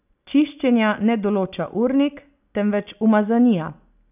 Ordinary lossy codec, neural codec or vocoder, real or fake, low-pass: none; none; real; 3.6 kHz